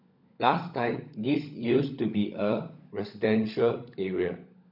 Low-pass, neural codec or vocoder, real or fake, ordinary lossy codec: 5.4 kHz; codec, 16 kHz, 16 kbps, FunCodec, trained on LibriTTS, 50 frames a second; fake; none